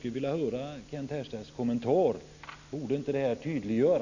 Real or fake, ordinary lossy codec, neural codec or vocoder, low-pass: real; none; none; 7.2 kHz